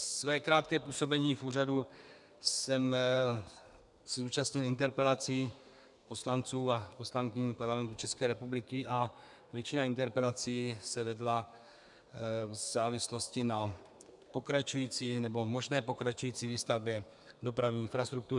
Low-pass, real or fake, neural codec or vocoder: 10.8 kHz; fake; codec, 32 kHz, 1.9 kbps, SNAC